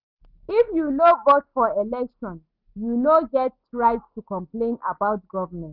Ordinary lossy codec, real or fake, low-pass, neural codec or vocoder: none; real; 5.4 kHz; none